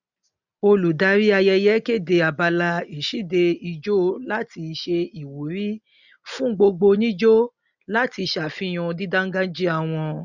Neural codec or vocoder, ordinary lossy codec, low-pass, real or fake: none; none; 7.2 kHz; real